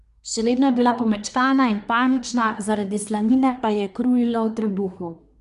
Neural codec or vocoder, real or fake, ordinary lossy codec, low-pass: codec, 24 kHz, 1 kbps, SNAC; fake; none; 10.8 kHz